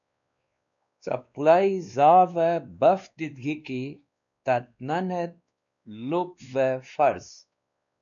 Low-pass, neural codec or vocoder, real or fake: 7.2 kHz; codec, 16 kHz, 2 kbps, X-Codec, WavLM features, trained on Multilingual LibriSpeech; fake